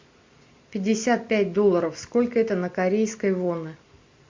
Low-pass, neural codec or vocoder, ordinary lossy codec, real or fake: 7.2 kHz; none; MP3, 48 kbps; real